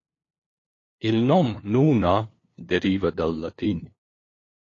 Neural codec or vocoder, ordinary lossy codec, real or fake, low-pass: codec, 16 kHz, 2 kbps, FunCodec, trained on LibriTTS, 25 frames a second; AAC, 32 kbps; fake; 7.2 kHz